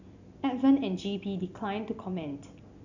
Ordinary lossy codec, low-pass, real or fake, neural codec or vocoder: none; 7.2 kHz; real; none